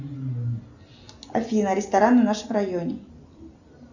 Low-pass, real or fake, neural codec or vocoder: 7.2 kHz; real; none